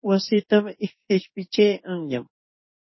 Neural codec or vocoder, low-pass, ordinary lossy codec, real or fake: codec, 16 kHz in and 24 kHz out, 1 kbps, XY-Tokenizer; 7.2 kHz; MP3, 24 kbps; fake